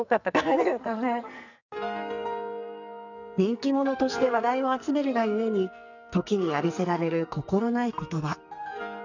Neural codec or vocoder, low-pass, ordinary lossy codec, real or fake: codec, 44.1 kHz, 2.6 kbps, SNAC; 7.2 kHz; none; fake